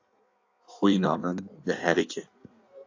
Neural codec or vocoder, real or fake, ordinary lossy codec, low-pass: codec, 16 kHz in and 24 kHz out, 1.1 kbps, FireRedTTS-2 codec; fake; AAC, 48 kbps; 7.2 kHz